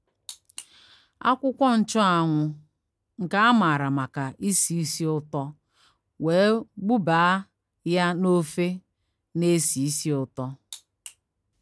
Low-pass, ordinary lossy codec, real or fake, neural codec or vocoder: none; none; real; none